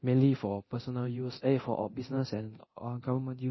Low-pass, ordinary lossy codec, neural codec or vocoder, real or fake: 7.2 kHz; MP3, 24 kbps; codec, 24 kHz, 0.9 kbps, DualCodec; fake